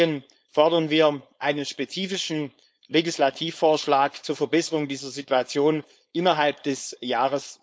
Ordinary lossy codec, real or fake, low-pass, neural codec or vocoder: none; fake; none; codec, 16 kHz, 4.8 kbps, FACodec